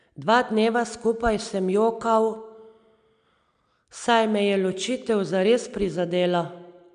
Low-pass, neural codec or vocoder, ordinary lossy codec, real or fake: 9.9 kHz; none; none; real